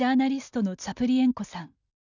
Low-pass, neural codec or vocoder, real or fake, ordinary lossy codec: 7.2 kHz; none; real; none